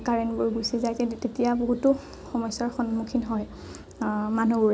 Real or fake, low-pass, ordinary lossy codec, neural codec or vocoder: real; none; none; none